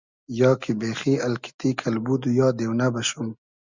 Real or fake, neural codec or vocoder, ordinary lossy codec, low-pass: real; none; Opus, 64 kbps; 7.2 kHz